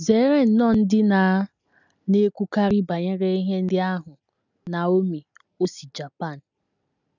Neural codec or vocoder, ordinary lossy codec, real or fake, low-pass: none; none; real; 7.2 kHz